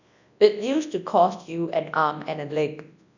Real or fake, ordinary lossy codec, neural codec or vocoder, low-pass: fake; none; codec, 24 kHz, 0.9 kbps, WavTokenizer, large speech release; 7.2 kHz